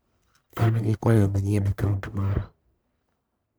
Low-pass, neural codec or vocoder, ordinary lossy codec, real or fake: none; codec, 44.1 kHz, 1.7 kbps, Pupu-Codec; none; fake